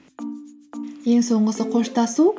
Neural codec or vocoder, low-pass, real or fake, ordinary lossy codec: none; none; real; none